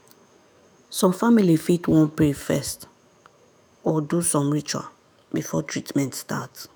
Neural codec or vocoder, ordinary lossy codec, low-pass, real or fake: autoencoder, 48 kHz, 128 numbers a frame, DAC-VAE, trained on Japanese speech; none; none; fake